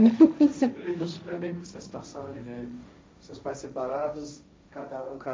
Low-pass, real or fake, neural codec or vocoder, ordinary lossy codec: none; fake; codec, 16 kHz, 1.1 kbps, Voila-Tokenizer; none